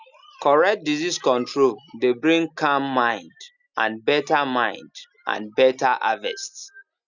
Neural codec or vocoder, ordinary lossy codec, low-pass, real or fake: none; none; 7.2 kHz; real